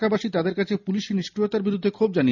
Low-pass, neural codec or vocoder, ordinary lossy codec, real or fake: 7.2 kHz; none; none; real